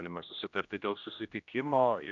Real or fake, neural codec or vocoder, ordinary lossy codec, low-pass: fake; codec, 16 kHz, 1 kbps, X-Codec, HuBERT features, trained on general audio; MP3, 64 kbps; 7.2 kHz